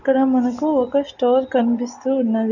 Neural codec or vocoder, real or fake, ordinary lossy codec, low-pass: none; real; none; 7.2 kHz